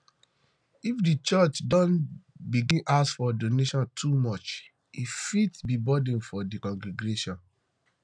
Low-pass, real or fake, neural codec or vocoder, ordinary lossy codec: 9.9 kHz; real; none; none